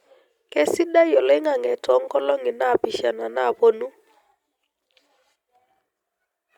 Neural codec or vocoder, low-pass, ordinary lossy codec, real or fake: none; 19.8 kHz; Opus, 64 kbps; real